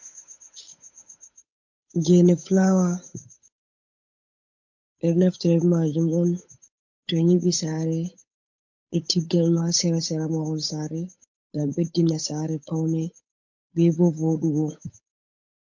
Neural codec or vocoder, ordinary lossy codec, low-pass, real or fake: codec, 16 kHz, 8 kbps, FunCodec, trained on Chinese and English, 25 frames a second; MP3, 48 kbps; 7.2 kHz; fake